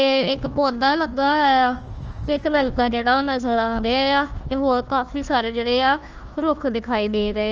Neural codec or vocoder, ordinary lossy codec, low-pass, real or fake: codec, 16 kHz, 1 kbps, FunCodec, trained on Chinese and English, 50 frames a second; Opus, 24 kbps; 7.2 kHz; fake